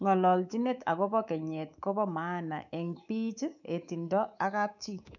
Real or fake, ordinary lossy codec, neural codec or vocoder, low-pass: fake; none; codec, 24 kHz, 3.1 kbps, DualCodec; 7.2 kHz